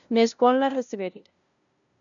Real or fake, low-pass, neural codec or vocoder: fake; 7.2 kHz; codec, 16 kHz, 0.5 kbps, FunCodec, trained on LibriTTS, 25 frames a second